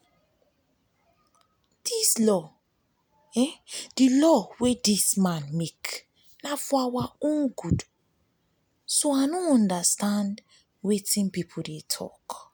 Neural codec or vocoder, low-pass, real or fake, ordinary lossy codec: none; none; real; none